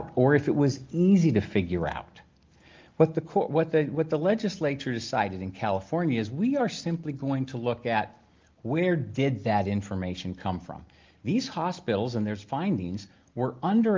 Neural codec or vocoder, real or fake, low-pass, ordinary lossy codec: none; real; 7.2 kHz; Opus, 24 kbps